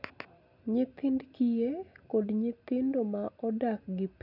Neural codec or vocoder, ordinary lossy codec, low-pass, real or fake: none; none; 5.4 kHz; real